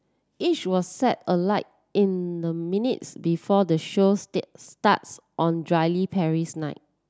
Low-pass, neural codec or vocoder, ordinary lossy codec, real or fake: none; none; none; real